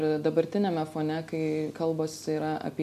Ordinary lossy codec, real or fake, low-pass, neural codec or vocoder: AAC, 96 kbps; real; 14.4 kHz; none